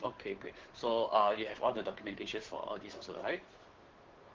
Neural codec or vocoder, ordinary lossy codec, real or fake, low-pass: codec, 16 kHz, 2 kbps, FunCodec, trained on Chinese and English, 25 frames a second; Opus, 16 kbps; fake; 7.2 kHz